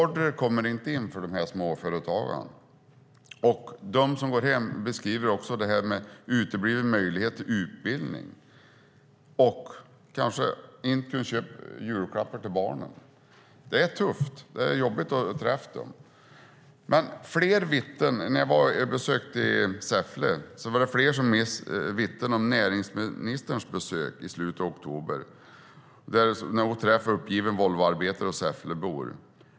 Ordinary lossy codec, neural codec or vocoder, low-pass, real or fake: none; none; none; real